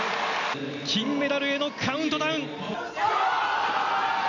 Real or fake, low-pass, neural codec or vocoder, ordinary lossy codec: real; 7.2 kHz; none; none